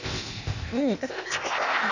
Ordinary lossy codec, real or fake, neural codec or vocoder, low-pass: none; fake; codec, 16 kHz, 0.8 kbps, ZipCodec; 7.2 kHz